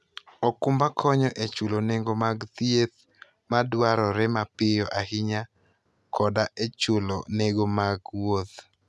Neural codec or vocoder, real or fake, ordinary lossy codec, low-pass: none; real; none; none